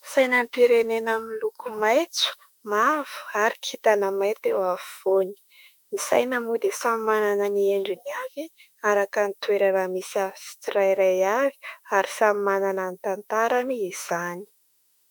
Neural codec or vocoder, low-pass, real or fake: autoencoder, 48 kHz, 32 numbers a frame, DAC-VAE, trained on Japanese speech; 19.8 kHz; fake